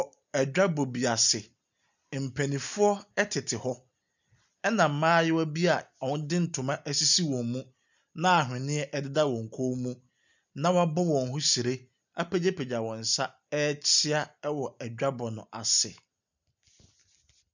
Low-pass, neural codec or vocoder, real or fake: 7.2 kHz; none; real